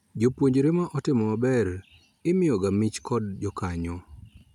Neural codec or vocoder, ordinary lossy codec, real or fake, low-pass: none; none; real; 19.8 kHz